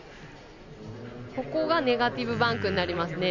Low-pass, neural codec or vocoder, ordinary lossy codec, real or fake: 7.2 kHz; none; none; real